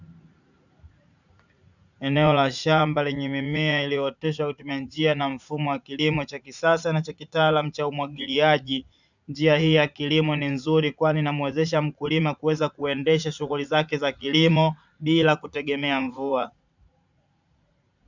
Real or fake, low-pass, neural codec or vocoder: fake; 7.2 kHz; vocoder, 44.1 kHz, 80 mel bands, Vocos